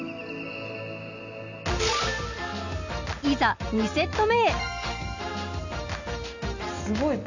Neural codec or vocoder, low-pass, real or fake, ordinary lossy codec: none; 7.2 kHz; real; none